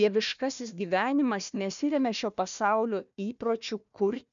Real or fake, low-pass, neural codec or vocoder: fake; 7.2 kHz; codec, 16 kHz, 1 kbps, FunCodec, trained on Chinese and English, 50 frames a second